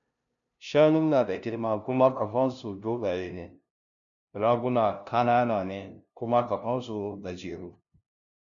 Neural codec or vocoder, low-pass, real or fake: codec, 16 kHz, 0.5 kbps, FunCodec, trained on LibriTTS, 25 frames a second; 7.2 kHz; fake